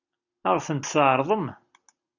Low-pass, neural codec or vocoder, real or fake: 7.2 kHz; none; real